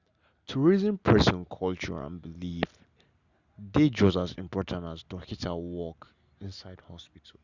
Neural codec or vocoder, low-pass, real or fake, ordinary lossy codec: none; 7.2 kHz; real; Opus, 64 kbps